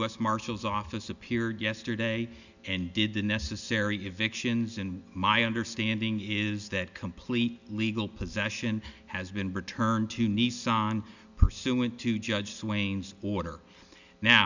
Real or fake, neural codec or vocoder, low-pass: real; none; 7.2 kHz